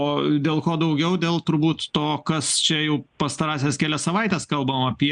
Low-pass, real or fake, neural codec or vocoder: 9.9 kHz; real; none